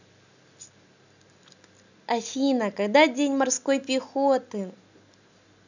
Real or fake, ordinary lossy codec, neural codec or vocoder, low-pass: real; none; none; 7.2 kHz